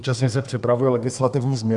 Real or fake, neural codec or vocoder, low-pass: fake; codec, 24 kHz, 1 kbps, SNAC; 10.8 kHz